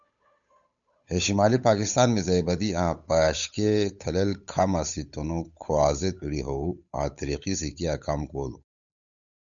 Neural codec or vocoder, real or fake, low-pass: codec, 16 kHz, 8 kbps, FunCodec, trained on Chinese and English, 25 frames a second; fake; 7.2 kHz